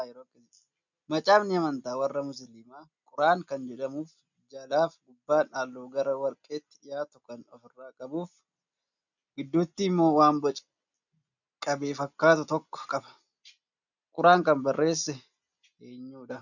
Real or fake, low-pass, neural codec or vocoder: real; 7.2 kHz; none